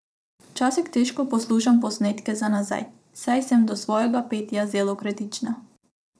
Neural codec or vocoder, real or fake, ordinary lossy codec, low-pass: vocoder, 22.05 kHz, 80 mel bands, Vocos; fake; none; none